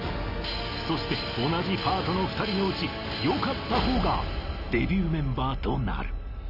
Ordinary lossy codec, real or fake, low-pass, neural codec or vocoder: none; real; 5.4 kHz; none